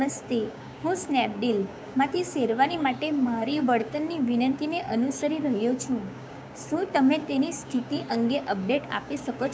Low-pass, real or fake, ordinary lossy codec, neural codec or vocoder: none; fake; none; codec, 16 kHz, 6 kbps, DAC